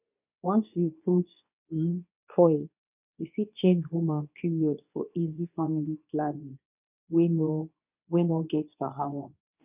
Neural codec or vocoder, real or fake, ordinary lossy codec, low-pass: codec, 24 kHz, 0.9 kbps, WavTokenizer, medium speech release version 2; fake; none; 3.6 kHz